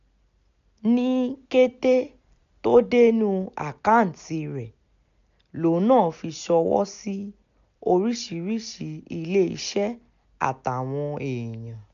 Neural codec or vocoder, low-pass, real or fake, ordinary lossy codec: none; 7.2 kHz; real; none